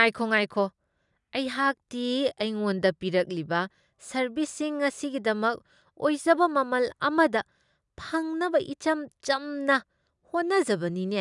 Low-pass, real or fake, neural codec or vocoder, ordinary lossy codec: 10.8 kHz; real; none; none